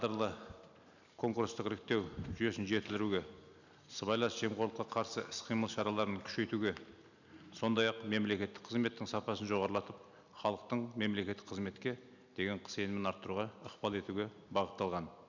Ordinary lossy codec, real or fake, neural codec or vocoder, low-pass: none; real; none; 7.2 kHz